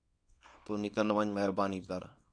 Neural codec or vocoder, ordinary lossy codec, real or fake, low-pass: codec, 24 kHz, 0.9 kbps, WavTokenizer, small release; AAC, 64 kbps; fake; 9.9 kHz